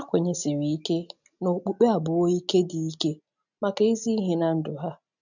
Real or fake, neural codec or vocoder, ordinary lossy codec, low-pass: real; none; none; 7.2 kHz